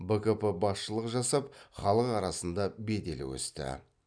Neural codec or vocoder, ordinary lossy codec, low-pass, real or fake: none; none; 9.9 kHz; real